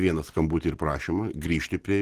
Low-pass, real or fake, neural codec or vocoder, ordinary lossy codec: 14.4 kHz; real; none; Opus, 16 kbps